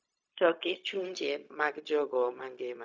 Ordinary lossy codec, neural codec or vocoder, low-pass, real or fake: none; codec, 16 kHz, 0.4 kbps, LongCat-Audio-Codec; none; fake